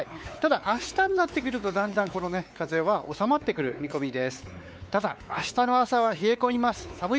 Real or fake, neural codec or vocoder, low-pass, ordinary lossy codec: fake; codec, 16 kHz, 4 kbps, X-Codec, WavLM features, trained on Multilingual LibriSpeech; none; none